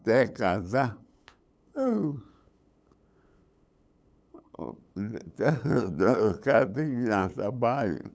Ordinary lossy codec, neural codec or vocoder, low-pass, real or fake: none; codec, 16 kHz, 8 kbps, FunCodec, trained on LibriTTS, 25 frames a second; none; fake